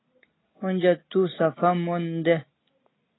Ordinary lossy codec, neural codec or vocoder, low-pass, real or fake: AAC, 16 kbps; none; 7.2 kHz; real